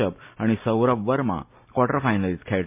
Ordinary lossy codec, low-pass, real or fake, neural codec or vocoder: MP3, 32 kbps; 3.6 kHz; real; none